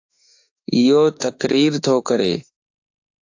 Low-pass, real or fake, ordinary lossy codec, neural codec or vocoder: 7.2 kHz; fake; AAC, 48 kbps; autoencoder, 48 kHz, 32 numbers a frame, DAC-VAE, trained on Japanese speech